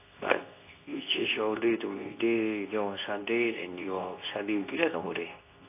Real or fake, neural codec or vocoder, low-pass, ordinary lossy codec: fake; codec, 24 kHz, 0.9 kbps, WavTokenizer, medium speech release version 2; 3.6 kHz; AAC, 24 kbps